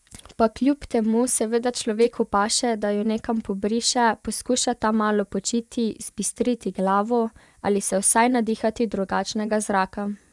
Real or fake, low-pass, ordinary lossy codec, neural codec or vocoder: fake; none; none; vocoder, 24 kHz, 100 mel bands, Vocos